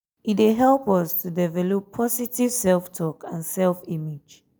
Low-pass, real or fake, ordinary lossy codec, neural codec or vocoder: none; real; none; none